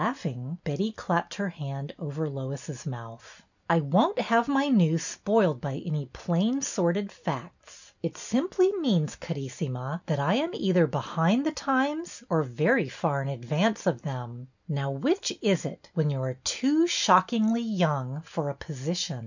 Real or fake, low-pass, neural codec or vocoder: real; 7.2 kHz; none